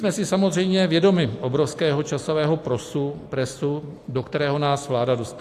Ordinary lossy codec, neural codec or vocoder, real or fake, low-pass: AAC, 64 kbps; none; real; 14.4 kHz